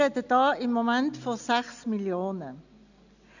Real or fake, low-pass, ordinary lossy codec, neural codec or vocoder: real; 7.2 kHz; none; none